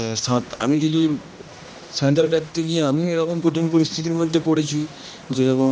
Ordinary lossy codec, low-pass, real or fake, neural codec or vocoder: none; none; fake; codec, 16 kHz, 1 kbps, X-Codec, HuBERT features, trained on general audio